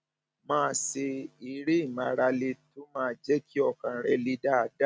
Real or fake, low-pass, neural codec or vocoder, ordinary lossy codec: real; none; none; none